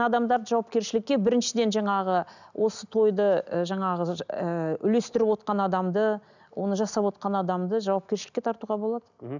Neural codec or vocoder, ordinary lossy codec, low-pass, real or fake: none; none; 7.2 kHz; real